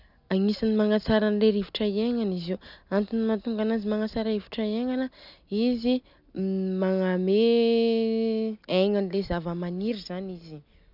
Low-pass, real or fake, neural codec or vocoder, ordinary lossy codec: 5.4 kHz; real; none; none